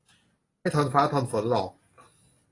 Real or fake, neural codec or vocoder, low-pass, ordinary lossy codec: real; none; 10.8 kHz; AAC, 32 kbps